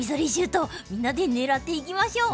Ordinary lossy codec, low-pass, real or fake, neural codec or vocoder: none; none; real; none